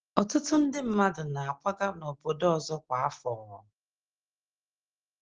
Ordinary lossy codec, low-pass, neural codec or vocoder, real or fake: Opus, 16 kbps; 7.2 kHz; none; real